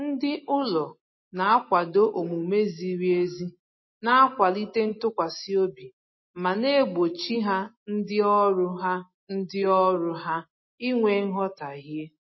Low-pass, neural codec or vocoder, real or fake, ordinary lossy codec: 7.2 kHz; none; real; MP3, 24 kbps